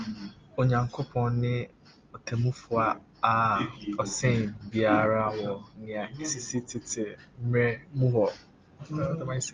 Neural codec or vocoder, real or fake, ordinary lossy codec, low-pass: none; real; Opus, 32 kbps; 7.2 kHz